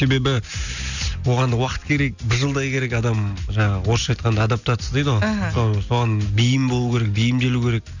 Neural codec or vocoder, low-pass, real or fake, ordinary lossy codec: none; 7.2 kHz; real; none